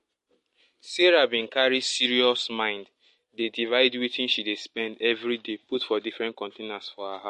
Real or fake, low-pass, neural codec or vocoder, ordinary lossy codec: real; 14.4 kHz; none; MP3, 48 kbps